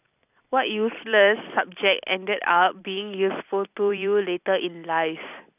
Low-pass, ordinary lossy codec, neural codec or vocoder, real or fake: 3.6 kHz; none; vocoder, 44.1 kHz, 128 mel bands every 256 samples, BigVGAN v2; fake